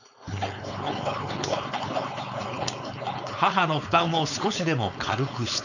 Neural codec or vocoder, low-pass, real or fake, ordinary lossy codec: codec, 16 kHz, 4.8 kbps, FACodec; 7.2 kHz; fake; none